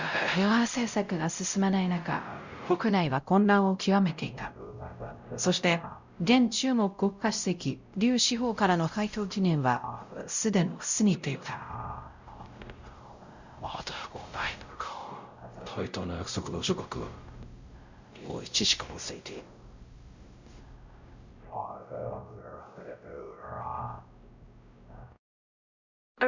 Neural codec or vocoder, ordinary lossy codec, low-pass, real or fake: codec, 16 kHz, 0.5 kbps, X-Codec, WavLM features, trained on Multilingual LibriSpeech; Opus, 64 kbps; 7.2 kHz; fake